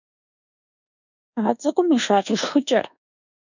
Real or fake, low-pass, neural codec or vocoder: fake; 7.2 kHz; codec, 24 kHz, 1.2 kbps, DualCodec